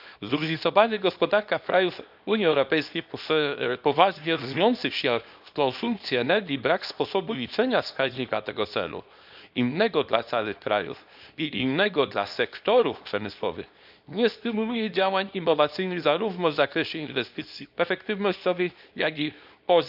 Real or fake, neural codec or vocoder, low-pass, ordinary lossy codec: fake; codec, 24 kHz, 0.9 kbps, WavTokenizer, small release; 5.4 kHz; none